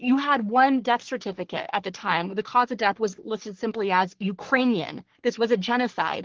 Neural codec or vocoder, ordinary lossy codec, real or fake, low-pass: codec, 44.1 kHz, 3.4 kbps, Pupu-Codec; Opus, 16 kbps; fake; 7.2 kHz